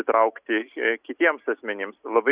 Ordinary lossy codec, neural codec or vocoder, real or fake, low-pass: Opus, 64 kbps; none; real; 3.6 kHz